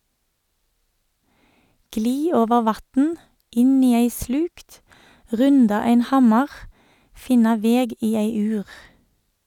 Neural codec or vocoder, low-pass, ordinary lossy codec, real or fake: none; 19.8 kHz; none; real